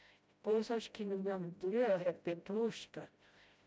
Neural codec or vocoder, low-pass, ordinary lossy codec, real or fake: codec, 16 kHz, 0.5 kbps, FreqCodec, smaller model; none; none; fake